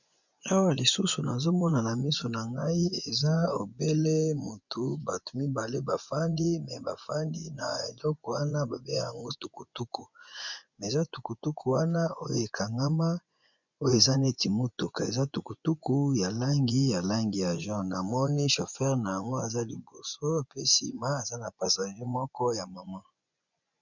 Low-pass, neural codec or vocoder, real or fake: 7.2 kHz; vocoder, 24 kHz, 100 mel bands, Vocos; fake